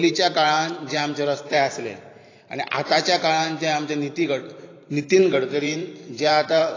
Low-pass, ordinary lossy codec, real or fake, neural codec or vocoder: 7.2 kHz; AAC, 32 kbps; fake; vocoder, 44.1 kHz, 128 mel bands every 512 samples, BigVGAN v2